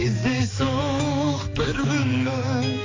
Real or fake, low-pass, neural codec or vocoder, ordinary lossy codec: fake; 7.2 kHz; codec, 16 kHz, 4 kbps, X-Codec, HuBERT features, trained on balanced general audio; AAC, 32 kbps